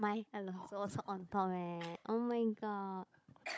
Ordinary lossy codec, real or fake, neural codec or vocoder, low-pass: none; fake; codec, 16 kHz, 16 kbps, FunCodec, trained on Chinese and English, 50 frames a second; none